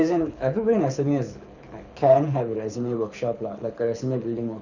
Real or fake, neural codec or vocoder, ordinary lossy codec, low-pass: fake; codec, 24 kHz, 6 kbps, HILCodec; none; 7.2 kHz